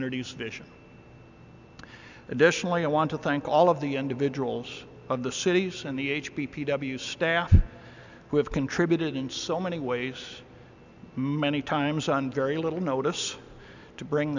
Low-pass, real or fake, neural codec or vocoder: 7.2 kHz; real; none